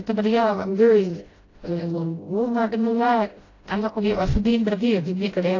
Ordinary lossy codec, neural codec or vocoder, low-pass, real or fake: AAC, 32 kbps; codec, 16 kHz, 0.5 kbps, FreqCodec, smaller model; 7.2 kHz; fake